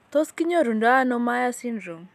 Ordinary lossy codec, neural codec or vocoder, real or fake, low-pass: none; none; real; 14.4 kHz